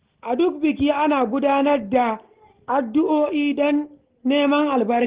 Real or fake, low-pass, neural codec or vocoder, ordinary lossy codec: real; 3.6 kHz; none; Opus, 16 kbps